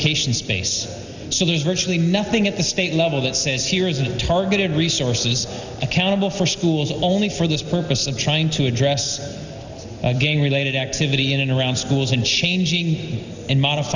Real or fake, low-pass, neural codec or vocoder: real; 7.2 kHz; none